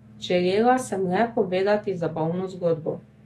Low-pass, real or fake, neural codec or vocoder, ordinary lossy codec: 19.8 kHz; fake; autoencoder, 48 kHz, 128 numbers a frame, DAC-VAE, trained on Japanese speech; AAC, 32 kbps